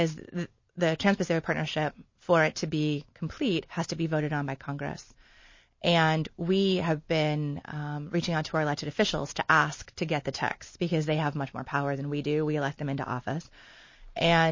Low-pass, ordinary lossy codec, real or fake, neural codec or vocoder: 7.2 kHz; MP3, 32 kbps; real; none